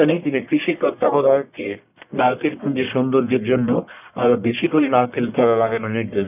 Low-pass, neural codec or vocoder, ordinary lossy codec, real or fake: 3.6 kHz; codec, 44.1 kHz, 1.7 kbps, Pupu-Codec; none; fake